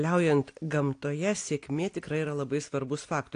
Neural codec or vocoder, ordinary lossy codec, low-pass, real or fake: none; AAC, 48 kbps; 9.9 kHz; real